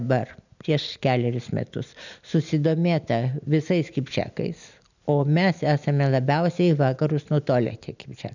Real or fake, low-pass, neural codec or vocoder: real; 7.2 kHz; none